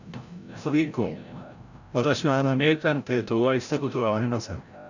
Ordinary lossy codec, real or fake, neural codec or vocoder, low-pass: none; fake; codec, 16 kHz, 0.5 kbps, FreqCodec, larger model; 7.2 kHz